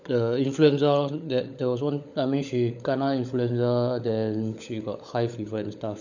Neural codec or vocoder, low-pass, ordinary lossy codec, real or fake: codec, 16 kHz, 16 kbps, FunCodec, trained on LibriTTS, 50 frames a second; 7.2 kHz; none; fake